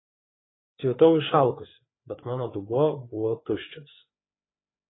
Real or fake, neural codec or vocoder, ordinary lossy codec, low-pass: fake; codec, 16 kHz, 4 kbps, FreqCodec, larger model; AAC, 16 kbps; 7.2 kHz